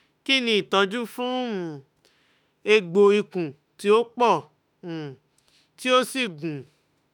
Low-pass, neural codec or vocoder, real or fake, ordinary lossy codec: 19.8 kHz; autoencoder, 48 kHz, 32 numbers a frame, DAC-VAE, trained on Japanese speech; fake; none